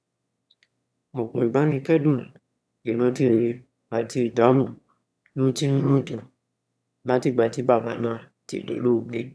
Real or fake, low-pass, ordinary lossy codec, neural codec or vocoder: fake; none; none; autoencoder, 22.05 kHz, a latent of 192 numbers a frame, VITS, trained on one speaker